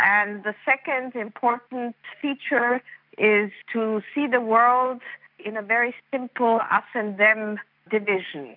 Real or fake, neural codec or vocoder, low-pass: real; none; 5.4 kHz